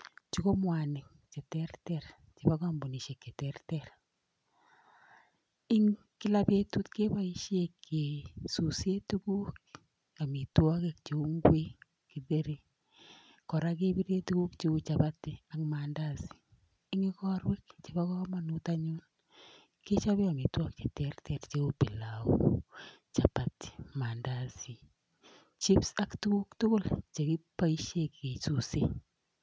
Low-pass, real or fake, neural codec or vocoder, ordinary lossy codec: none; real; none; none